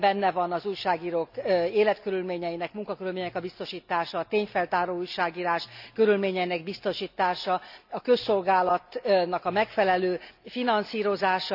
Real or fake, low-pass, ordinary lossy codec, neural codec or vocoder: real; 5.4 kHz; none; none